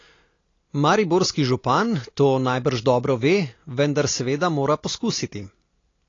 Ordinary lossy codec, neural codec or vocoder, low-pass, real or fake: AAC, 32 kbps; none; 7.2 kHz; real